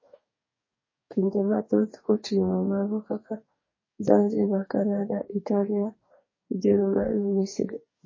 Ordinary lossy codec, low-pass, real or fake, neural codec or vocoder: MP3, 32 kbps; 7.2 kHz; fake; codec, 44.1 kHz, 2.6 kbps, DAC